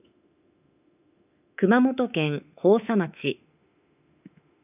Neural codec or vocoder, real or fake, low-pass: autoencoder, 48 kHz, 32 numbers a frame, DAC-VAE, trained on Japanese speech; fake; 3.6 kHz